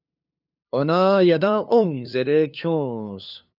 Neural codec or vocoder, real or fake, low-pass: codec, 16 kHz, 2 kbps, FunCodec, trained on LibriTTS, 25 frames a second; fake; 5.4 kHz